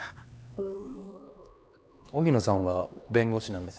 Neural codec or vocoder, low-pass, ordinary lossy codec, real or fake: codec, 16 kHz, 2 kbps, X-Codec, HuBERT features, trained on LibriSpeech; none; none; fake